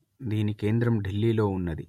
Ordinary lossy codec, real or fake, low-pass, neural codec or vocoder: MP3, 64 kbps; real; 19.8 kHz; none